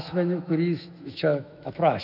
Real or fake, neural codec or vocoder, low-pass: fake; autoencoder, 48 kHz, 128 numbers a frame, DAC-VAE, trained on Japanese speech; 5.4 kHz